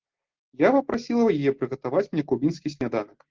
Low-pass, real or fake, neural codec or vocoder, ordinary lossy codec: 7.2 kHz; real; none; Opus, 16 kbps